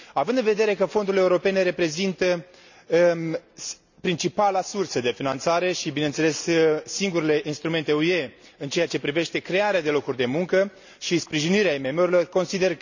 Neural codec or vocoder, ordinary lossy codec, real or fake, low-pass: none; none; real; 7.2 kHz